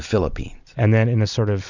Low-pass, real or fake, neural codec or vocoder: 7.2 kHz; fake; vocoder, 44.1 kHz, 128 mel bands every 256 samples, BigVGAN v2